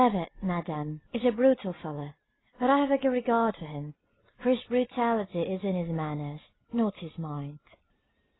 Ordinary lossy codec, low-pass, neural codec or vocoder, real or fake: AAC, 16 kbps; 7.2 kHz; none; real